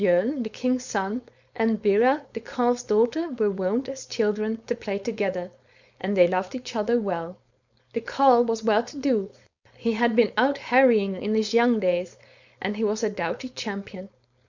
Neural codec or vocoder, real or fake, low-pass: codec, 16 kHz, 4.8 kbps, FACodec; fake; 7.2 kHz